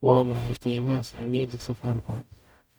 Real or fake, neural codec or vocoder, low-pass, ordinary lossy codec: fake; codec, 44.1 kHz, 0.9 kbps, DAC; none; none